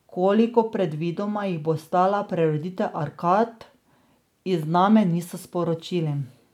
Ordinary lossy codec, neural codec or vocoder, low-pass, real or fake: none; none; 19.8 kHz; real